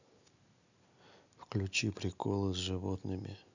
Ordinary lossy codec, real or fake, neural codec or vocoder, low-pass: none; real; none; 7.2 kHz